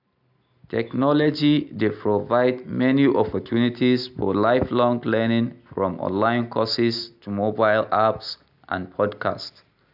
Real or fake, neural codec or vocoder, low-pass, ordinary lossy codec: real; none; 5.4 kHz; AAC, 48 kbps